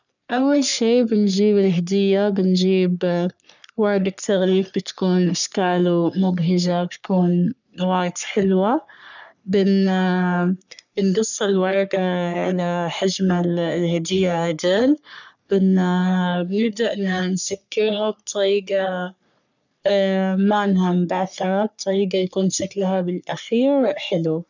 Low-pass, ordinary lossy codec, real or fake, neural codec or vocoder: 7.2 kHz; none; fake; codec, 44.1 kHz, 3.4 kbps, Pupu-Codec